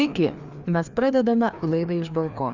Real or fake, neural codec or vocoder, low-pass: fake; codec, 16 kHz, 2 kbps, FreqCodec, larger model; 7.2 kHz